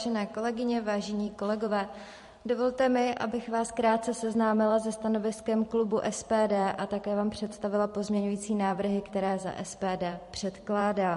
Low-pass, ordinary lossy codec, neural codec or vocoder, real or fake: 14.4 kHz; MP3, 48 kbps; vocoder, 44.1 kHz, 128 mel bands every 512 samples, BigVGAN v2; fake